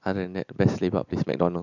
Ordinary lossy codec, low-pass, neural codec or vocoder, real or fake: none; 7.2 kHz; none; real